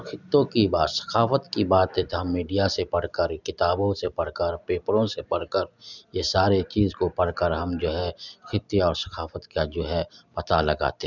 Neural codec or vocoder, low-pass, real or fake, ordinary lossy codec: none; 7.2 kHz; real; none